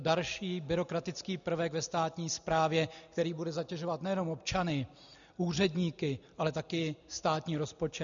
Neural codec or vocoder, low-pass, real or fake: none; 7.2 kHz; real